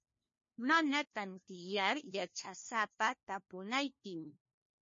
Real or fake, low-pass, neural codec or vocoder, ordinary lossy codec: fake; 7.2 kHz; codec, 16 kHz, 1 kbps, FunCodec, trained on LibriTTS, 50 frames a second; MP3, 32 kbps